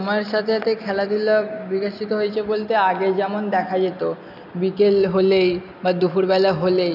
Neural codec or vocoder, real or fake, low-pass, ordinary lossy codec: none; real; 5.4 kHz; none